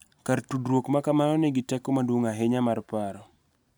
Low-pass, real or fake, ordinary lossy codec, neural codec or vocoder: none; real; none; none